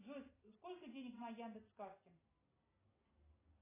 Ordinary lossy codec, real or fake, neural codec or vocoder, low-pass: AAC, 16 kbps; real; none; 3.6 kHz